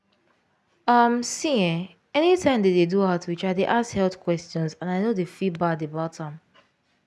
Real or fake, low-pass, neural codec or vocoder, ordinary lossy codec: real; none; none; none